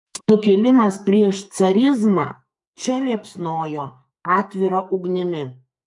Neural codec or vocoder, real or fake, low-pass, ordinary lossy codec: codec, 44.1 kHz, 2.6 kbps, SNAC; fake; 10.8 kHz; MP3, 64 kbps